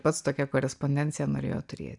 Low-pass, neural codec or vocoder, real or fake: 10.8 kHz; none; real